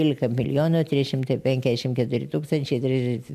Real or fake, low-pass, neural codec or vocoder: real; 14.4 kHz; none